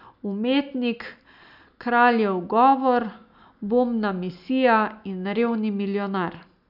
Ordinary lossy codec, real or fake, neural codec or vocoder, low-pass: none; real; none; 5.4 kHz